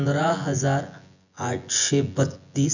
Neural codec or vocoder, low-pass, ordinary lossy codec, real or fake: vocoder, 24 kHz, 100 mel bands, Vocos; 7.2 kHz; none; fake